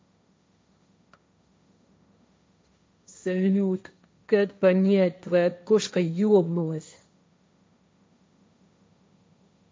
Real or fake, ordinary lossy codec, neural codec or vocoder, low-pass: fake; none; codec, 16 kHz, 1.1 kbps, Voila-Tokenizer; none